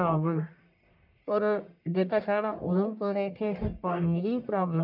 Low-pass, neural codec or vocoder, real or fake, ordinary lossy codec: 5.4 kHz; codec, 44.1 kHz, 1.7 kbps, Pupu-Codec; fake; none